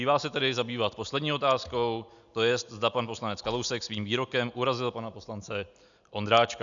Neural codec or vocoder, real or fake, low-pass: none; real; 7.2 kHz